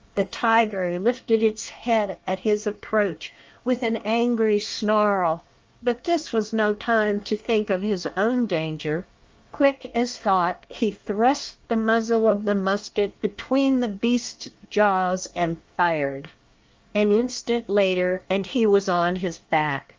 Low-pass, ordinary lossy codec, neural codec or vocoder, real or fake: 7.2 kHz; Opus, 24 kbps; codec, 24 kHz, 1 kbps, SNAC; fake